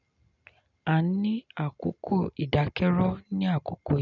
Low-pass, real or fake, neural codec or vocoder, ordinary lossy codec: 7.2 kHz; real; none; none